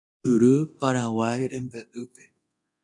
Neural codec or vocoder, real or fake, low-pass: codec, 24 kHz, 0.9 kbps, DualCodec; fake; 10.8 kHz